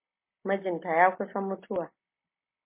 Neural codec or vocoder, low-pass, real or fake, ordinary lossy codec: none; 3.6 kHz; real; MP3, 24 kbps